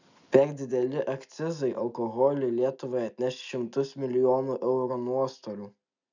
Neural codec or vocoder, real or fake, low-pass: none; real; 7.2 kHz